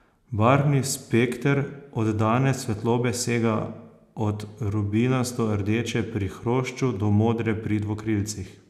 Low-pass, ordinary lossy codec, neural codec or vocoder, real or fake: 14.4 kHz; none; none; real